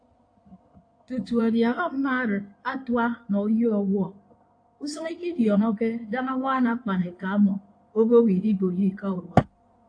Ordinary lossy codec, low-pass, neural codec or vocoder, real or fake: AAC, 48 kbps; 9.9 kHz; codec, 16 kHz in and 24 kHz out, 2.2 kbps, FireRedTTS-2 codec; fake